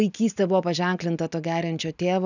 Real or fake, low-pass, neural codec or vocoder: real; 7.2 kHz; none